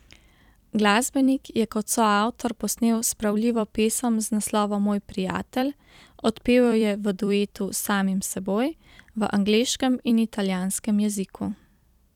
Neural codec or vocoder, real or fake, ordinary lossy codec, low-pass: vocoder, 44.1 kHz, 128 mel bands every 256 samples, BigVGAN v2; fake; none; 19.8 kHz